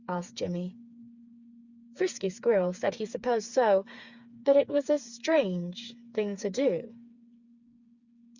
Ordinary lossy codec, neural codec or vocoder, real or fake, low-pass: Opus, 64 kbps; codec, 16 kHz, 4 kbps, FreqCodec, smaller model; fake; 7.2 kHz